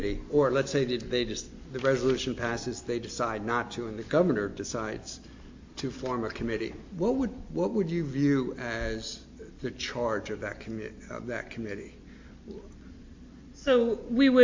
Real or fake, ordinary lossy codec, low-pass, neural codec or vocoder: real; MP3, 48 kbps; 7.2 kHz; none